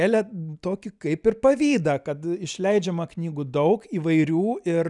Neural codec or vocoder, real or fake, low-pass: none; real; 10.8 kHz